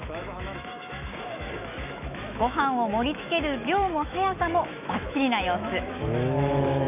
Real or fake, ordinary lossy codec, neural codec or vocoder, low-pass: fake; none; autoencoder, 48 kHz, 128 numbers a frame, DAC-VAE, trained on Japanese speech; 3.6 kHz